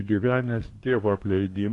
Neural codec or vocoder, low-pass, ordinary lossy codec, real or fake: codec, 24 kHz, 1 kbps, SNAC; 10.8 kHz; AAC, 48 kbps; fake